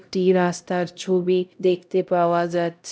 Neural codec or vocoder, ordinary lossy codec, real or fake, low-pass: codec, 16 kHz, 0.5 kbps, X-Codec, HuBERT features, trained on LibriSpeech; none; fake; none